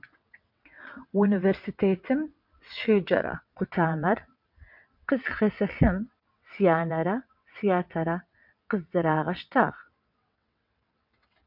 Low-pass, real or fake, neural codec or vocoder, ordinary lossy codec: 5.4 kHz; fake; vocoder, 22.05 kHz, 80 mel bands, WaveNeXt; MP3, 48 kbps